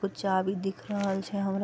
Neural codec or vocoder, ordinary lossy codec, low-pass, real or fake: none; none; none; real